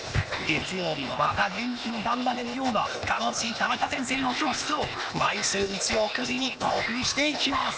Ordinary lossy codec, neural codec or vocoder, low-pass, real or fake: none; codec, 16 kHz, 0.8 kbps, ZipCodec; none; fake